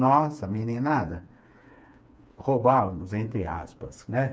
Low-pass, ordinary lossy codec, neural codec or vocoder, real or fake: none; none; codec, 16 kHz, 4 kbps, FreqCodec, smaller model; fake